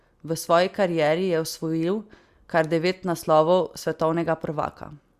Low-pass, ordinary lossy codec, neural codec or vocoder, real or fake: 14.4 kHz; Opus, 64 kbps; none; real